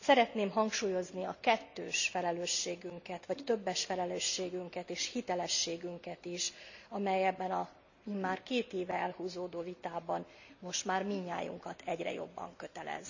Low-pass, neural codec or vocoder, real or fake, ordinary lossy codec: 7.2 kHz; none; real; none